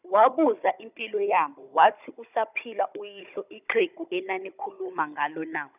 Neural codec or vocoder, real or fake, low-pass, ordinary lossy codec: codec, 16 kHz, 16 kbps, FunCodec, trained on Chinese and English, 50 frames a second; fake; 3.6 kHz; none